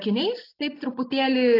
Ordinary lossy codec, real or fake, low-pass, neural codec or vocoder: AAC, 32 kbps; real; 5.4 kHz; none